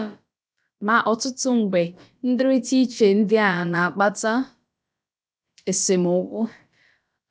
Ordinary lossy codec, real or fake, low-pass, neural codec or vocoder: none; fake; none; codec, 16 kHz, about 1 kbps, DyCAST, with the encoder's durations